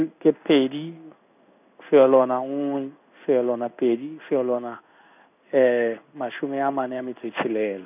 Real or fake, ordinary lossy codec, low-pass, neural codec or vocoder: fake; none; 3.6 kHz; codec, 16 kHz in and 24 kHz out, 1 kbps, XY-Tokenizer